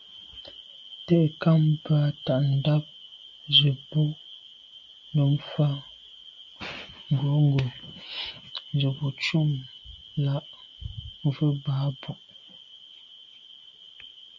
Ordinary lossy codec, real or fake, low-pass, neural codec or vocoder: MP3, 64 kbps; real; 7.2 kHz; none